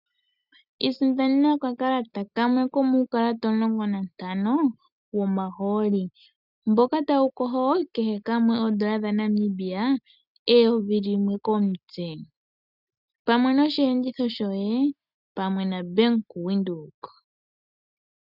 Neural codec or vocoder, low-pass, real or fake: none; 5.4 kHz; real